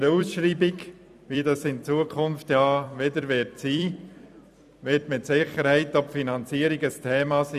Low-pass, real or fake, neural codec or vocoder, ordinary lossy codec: 14.4 kHz; fake; vocoder, 44.1 kHz, 128 mel bands every 256 samples, BigVGAN v2; none